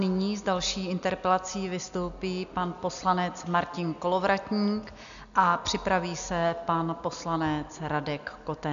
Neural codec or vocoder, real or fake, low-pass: none; real; 7.2 kHz